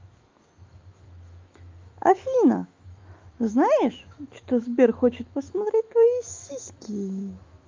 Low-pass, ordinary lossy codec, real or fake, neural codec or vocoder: 7.2 kHz; Opus, 32 kbps; fake; autoencoder, 48 kHz, 128 numbers a frame, DAC-VAE, trained on Japanese speech